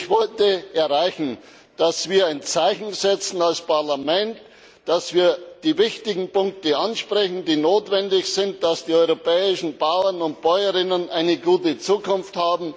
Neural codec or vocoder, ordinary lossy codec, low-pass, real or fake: none; none; none; real